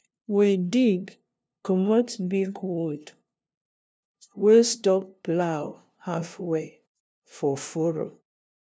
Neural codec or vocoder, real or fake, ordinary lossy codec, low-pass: codec, 16 kHz, 0.5 kbps, FunCodec, trained on LibriTTS, 25 frames a second; fake; none; none